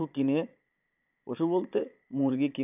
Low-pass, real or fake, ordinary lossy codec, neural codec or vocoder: 3.6 kHz; fake; none; vocoder, 22.05 kHz, 80 mel bands, Vocos